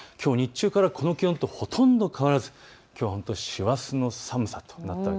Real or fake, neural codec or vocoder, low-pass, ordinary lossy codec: real; none; none; none